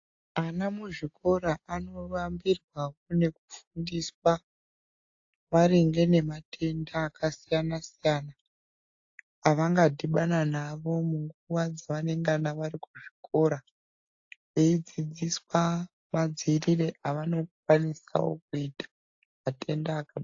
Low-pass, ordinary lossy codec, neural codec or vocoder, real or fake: 7.2 kHz; AAC, 48 kbps; none; real